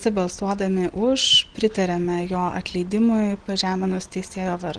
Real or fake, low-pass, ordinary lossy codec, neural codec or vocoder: real; 9.9 kHz; Opus, 16 kbps; none